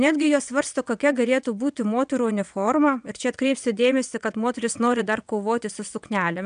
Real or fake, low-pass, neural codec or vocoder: fake; 9.9 kHz; vocoder, 22.05 kHz, 80 mel bands, WaveNeXt